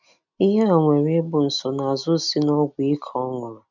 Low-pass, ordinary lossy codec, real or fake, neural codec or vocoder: 7.2 kHz; none; real; none